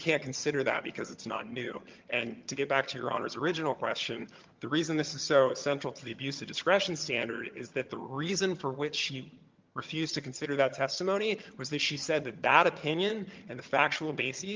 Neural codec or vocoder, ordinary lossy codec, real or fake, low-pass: vocoder, 22.05 kHz, 80 mel bands, HiFi-GAN; Opus, 32 kbps; fake; 7.2 kHz